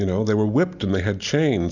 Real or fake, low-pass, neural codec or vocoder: real; 7.2 kHz; none